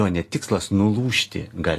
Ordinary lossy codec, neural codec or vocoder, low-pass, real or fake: AAC, 48 kbps; none; 14.4 kHz; real